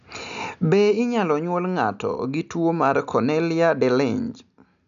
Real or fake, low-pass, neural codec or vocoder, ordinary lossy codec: real; 7.2 kHz; none; none